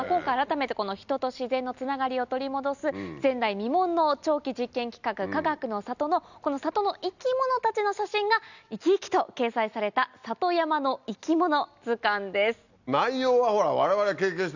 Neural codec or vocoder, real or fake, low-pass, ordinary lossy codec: none; real; 7.2 kHz; none